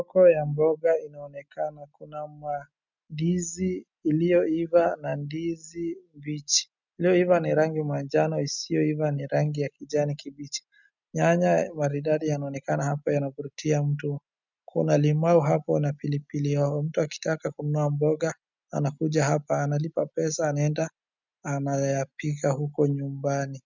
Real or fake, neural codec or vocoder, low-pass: real; none; 7.2 kHz